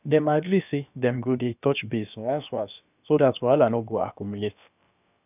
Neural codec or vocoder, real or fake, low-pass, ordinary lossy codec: codec, 16 kHz, about 1 kbps, DyCAST, with the encoder's durations; fake; 3.6 kHz; none